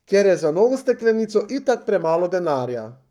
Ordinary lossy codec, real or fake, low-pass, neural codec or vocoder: none; fake; 19.8 kHz; codec, 44.1 kHz, 7.8 kbps, Pupu-Codec